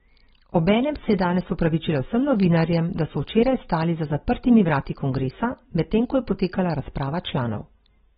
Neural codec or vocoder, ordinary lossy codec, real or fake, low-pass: none; AAC, 16 kbps; real; 14.4 kHz